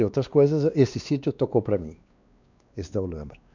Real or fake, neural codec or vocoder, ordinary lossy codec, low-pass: fake; codec, 16 kHz, 2 kbps, X-Codec, WavLM features, trained on Multilingual LibriSpeech; none; 7.2 kHz